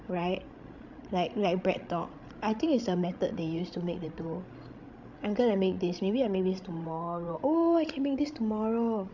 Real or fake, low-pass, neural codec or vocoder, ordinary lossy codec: fake; 7.2 kHz; codec, 16 kHz, 16 kbps, FreqCodec, larger model; MP3, 64 kbps